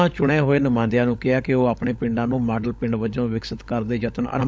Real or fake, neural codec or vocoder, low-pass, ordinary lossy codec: fake; codec, 16 kHz, 16 kbps, FunCodec, trained on LibriTTS, 50 frames a second; none; none